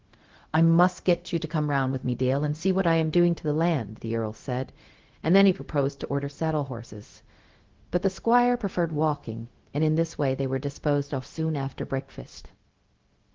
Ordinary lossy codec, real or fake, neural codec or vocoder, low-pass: Opus, 16 kbps; fake; codec, 16 kHz, 0.4 kbps, LongCat-Audio-Codec; 7.2 kHz